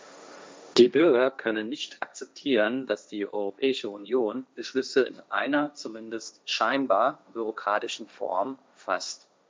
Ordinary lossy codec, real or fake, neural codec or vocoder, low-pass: none; fake; codec, 16 kHz, 1.1 kbps, Voila-Tokenizer; none